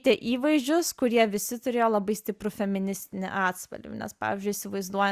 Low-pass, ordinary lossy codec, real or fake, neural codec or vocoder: 14.4 kHz; Opus, 64 kbps; real; none